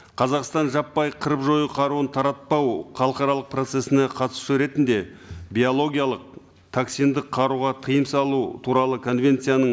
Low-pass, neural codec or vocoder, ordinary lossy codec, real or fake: none; none; none; real